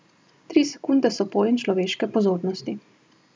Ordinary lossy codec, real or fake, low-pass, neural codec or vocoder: none; real; none; none